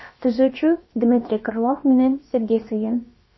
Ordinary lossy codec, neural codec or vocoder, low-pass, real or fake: MP3, 24 kbps; codec, 16 kHz, about 1 kbps, DyCAST, with the encoder's durations; 7.2 kHz; fake